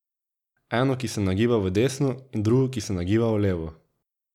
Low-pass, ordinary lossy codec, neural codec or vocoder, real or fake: 19.8 kHz; none; none; real